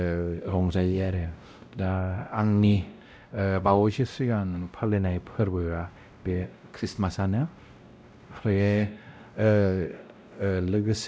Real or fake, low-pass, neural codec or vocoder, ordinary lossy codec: fake; none; codec, 16 kHz, 0.5 kbps, X-Codec, WavLM features, trained on Multilingual LibriSpeech; none